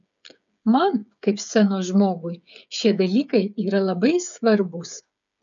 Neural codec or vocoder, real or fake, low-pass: codec, 16 kHz, 8 kbps, FreqCodec, smaller model; fake; 7.2 kHz